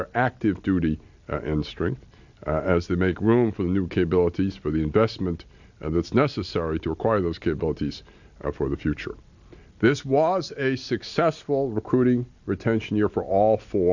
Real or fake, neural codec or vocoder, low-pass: real; none; 7.2 kHz